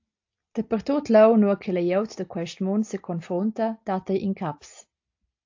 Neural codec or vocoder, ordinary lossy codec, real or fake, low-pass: none; AAC, 48 kbps; real; 7.2 kHz